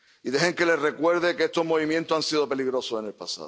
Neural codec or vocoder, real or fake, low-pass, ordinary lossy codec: none; real; none; none